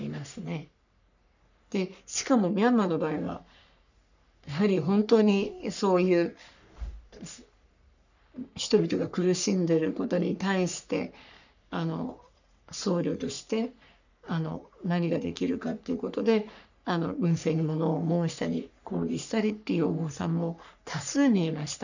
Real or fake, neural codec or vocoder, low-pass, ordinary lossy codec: fake; codec, 44.1 kHz, 3.4 kbps, Pupu-Codec; 7.2 kHz; none